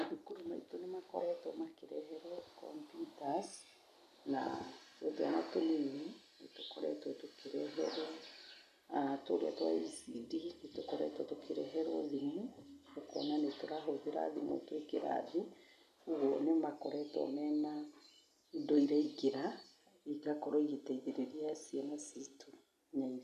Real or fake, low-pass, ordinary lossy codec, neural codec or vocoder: real; 14.4 kHz; none; none